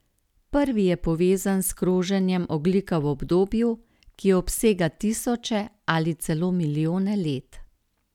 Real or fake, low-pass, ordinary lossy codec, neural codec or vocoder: real; 19.8 kHz; none; none